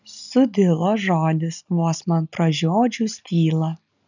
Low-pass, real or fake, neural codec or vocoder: 7.2 kHz; real; none